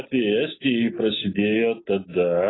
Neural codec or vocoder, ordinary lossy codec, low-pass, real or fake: none; AAC, 16 kbps; 7.2 kHz; real